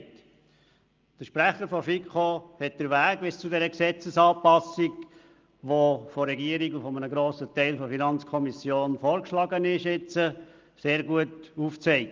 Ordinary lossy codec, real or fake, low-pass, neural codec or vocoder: Opus, 32 kbps; real; 7.2 kHz; none